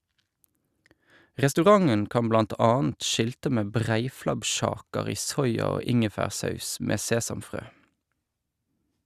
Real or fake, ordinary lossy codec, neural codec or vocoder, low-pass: real; Opus, 64 kbps; none; 14.4 kHz